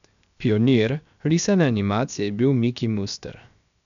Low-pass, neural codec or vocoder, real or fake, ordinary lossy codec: 7.2 kHz; codec, 16 kHz, 0.7 kbps, FocalCodec; fake; none